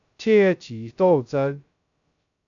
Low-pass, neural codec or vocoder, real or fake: 7.2 kHz; codec, 16 kHz, 0.2 kbps, FocalCodec; fake